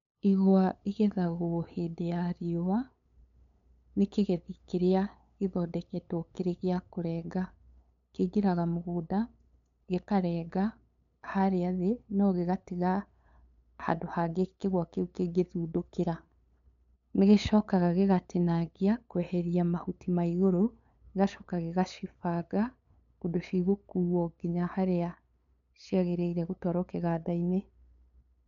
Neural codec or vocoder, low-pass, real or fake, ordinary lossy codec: codec, 16 kHz, 8 kbps, FunCodec, trained on LibriTTS, 25 frames a second; 7.2 kHz; fake; none